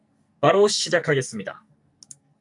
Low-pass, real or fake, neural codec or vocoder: 10.8 kHz; fake; codec, 44.1 kHz, 2.6 kbps, SNAC